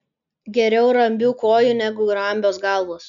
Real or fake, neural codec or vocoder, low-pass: real; none; 7.2 kHz